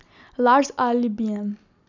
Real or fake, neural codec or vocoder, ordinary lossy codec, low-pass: real; none; none; 7.2 kHz